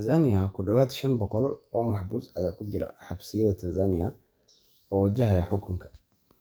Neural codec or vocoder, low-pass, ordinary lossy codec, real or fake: codec, 44.1 kHz, 2.6 kbps, SNAC; none; none; fake